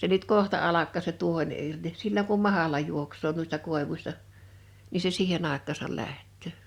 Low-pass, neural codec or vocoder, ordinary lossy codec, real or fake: 19.8 kHz; none; Opus, 64 kbps; real